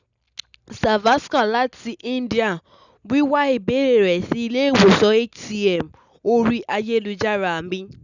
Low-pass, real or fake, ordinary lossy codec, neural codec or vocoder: 7.2 kHz; real; none; none